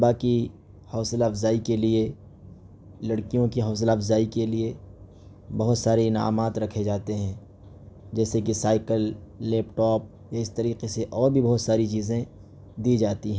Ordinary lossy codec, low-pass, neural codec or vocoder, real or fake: none; none; none; real